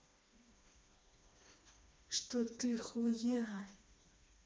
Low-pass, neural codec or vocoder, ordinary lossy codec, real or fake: none; codec, 16 kHz, 2 kbps, FreqCodec, smaller model; none; fake